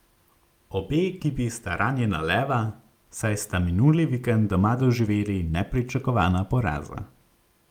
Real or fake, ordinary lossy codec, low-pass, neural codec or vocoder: real; Opus, 32 kbps; 19.8 kHz; none